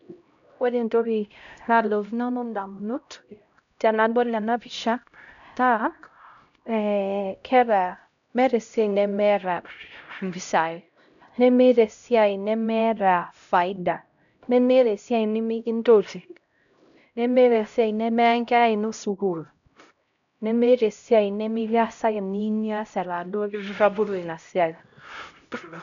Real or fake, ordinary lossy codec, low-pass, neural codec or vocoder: fake; none; 7.2 kHz; codec, 16 kHz, 0.5 kbps, X-Codec, HuBERT features, trained on LibriSpeech